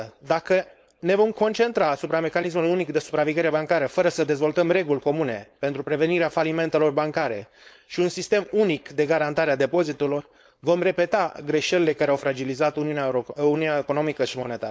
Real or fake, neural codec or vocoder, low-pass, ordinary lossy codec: fake; codec, 16 kHz, 4.8 kbps, FACodec; none; none